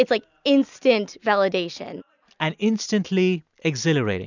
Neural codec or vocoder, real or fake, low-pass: none; real; 7.2 kHz